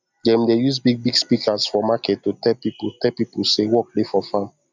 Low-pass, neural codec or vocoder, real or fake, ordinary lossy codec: 7.2 kHz; none; real; none